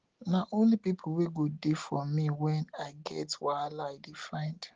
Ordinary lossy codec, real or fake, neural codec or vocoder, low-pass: Opus, 16 kbps; real; none; 7.2 kHz